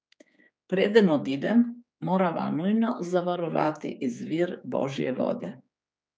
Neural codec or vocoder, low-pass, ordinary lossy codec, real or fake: codec, 16 kHz, 4 kbps, X-Codec, HuBERT features, trained on general audio; none; none; fake